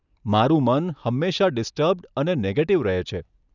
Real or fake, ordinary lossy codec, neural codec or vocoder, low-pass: real; none; none; 7.2 kHz